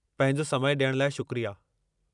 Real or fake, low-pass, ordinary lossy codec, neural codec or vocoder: fake; 10.8 kHz; none; vocoder, 44.1 kHz, 128 mel bands every 256 samples, BigVGAN v2